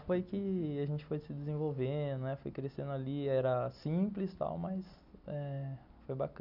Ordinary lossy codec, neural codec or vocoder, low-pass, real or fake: none; none; 5.4 kHz; real